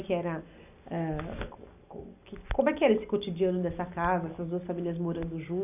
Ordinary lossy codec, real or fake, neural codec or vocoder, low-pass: none; real; none; 3.6 kHz